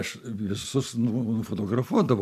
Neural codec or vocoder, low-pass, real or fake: none; 14.4 kHz; real